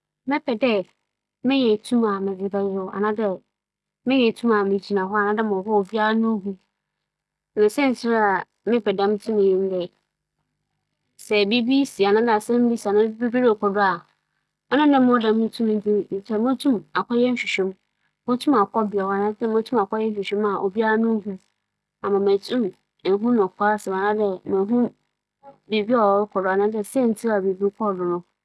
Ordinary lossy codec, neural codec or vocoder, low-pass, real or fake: none; none; none; real